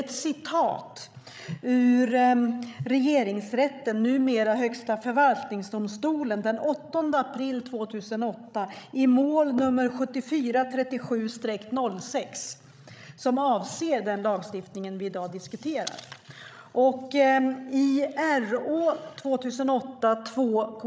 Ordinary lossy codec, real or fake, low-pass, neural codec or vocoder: none; fake; none; codec, 16 kHz, 16 kbps, FreqCodec, larger model